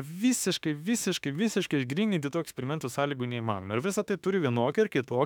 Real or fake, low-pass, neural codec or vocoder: fake; 19.8 kHz; autoencoder, 48 kHz, 32 numbers a frame, DAC-VAE, trained on Japanese speech